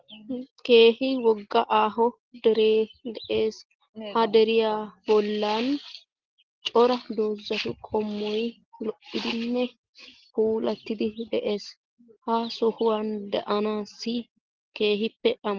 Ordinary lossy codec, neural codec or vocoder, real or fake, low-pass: Opus, 16 kbps; none; real; 7.2 kHz